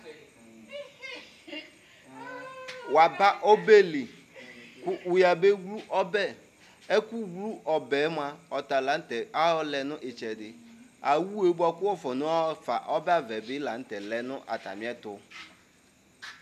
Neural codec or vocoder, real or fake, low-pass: none; real; 14.4 kHz